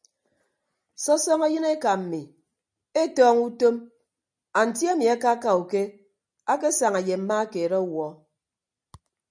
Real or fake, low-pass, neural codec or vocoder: real; 9.9 kHz; none